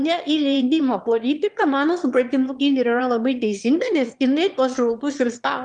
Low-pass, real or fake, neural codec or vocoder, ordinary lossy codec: 9.9 kHz; fake; autoencoder, 22.05 kHz, a latent of 192 numbers a frame, VITS, trained on one speaker; Opus, 32 kbps